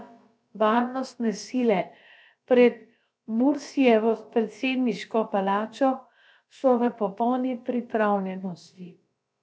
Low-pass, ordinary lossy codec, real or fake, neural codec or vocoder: none; none; fake; codec, 16 kHz, about 1 kbps, DyCAST, with the encoder's durations